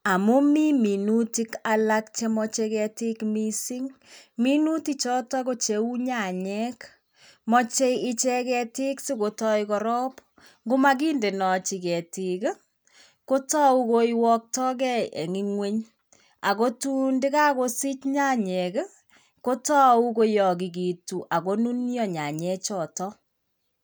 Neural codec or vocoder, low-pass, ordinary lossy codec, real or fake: none; none; none; real